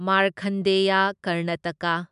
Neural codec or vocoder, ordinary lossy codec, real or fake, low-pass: none; MP3, 96 kbps; real; 10.8 kHz